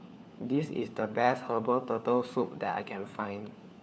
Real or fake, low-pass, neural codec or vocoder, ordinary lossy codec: fake; none; codec, 16 kHz, 4 kbps, FunCodec, trained on LibriTTS, 50 frames a second; none